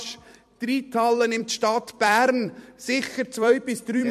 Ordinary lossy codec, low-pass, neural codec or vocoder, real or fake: MP3, 64 kbps; 14.4 kHz; vocoder, 44.1 kHz, 128 mel bands every 256 samples, BigVGAN v2; fake